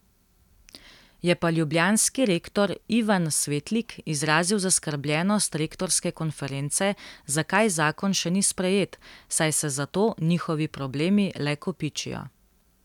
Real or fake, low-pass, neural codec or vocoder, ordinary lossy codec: real; 19.8 kHz; none; none